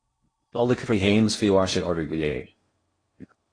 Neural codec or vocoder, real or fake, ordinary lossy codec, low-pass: codec, 16 kHz in and 24 kHz out, 0.6 kbps, FocalCodec, streaming, 4096 codes; fake; AAC, 32 kbps; 9.9 kHz